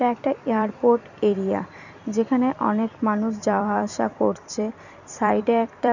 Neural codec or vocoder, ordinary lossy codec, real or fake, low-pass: vocoder, 44.1 kHz, 128 mel bands every 256 samples, BigVGAN v2; none; fake; 7.2 kHz